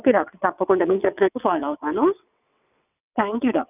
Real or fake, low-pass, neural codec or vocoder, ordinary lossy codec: fake; 3.6 kHz; vocoder, 22.05 kHz, 80 mel bands, WaveNeXt; none